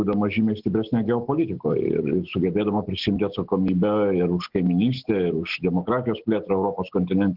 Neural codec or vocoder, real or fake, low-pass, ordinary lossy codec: none; real; 5.4 kHz; Opus, 16 kbps